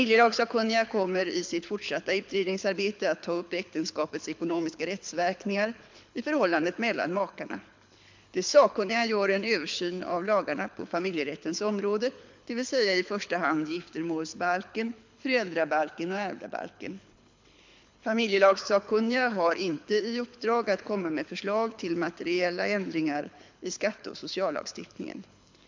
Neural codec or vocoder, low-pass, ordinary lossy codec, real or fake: codec, 24 kHz, 6 kbps, HILCodec; 7.2 kHz; MP3, 64 kbps; fake